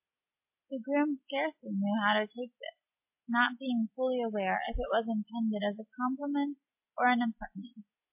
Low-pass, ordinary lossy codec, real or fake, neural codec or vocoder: 3.6 kHz; MP3, 32 kbps; real; none